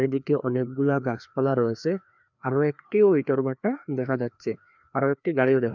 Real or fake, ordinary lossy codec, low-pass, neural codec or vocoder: fake; none; 7.2 kHz; codec, 16 kHz, 2 kbps, FreqCodec, larger model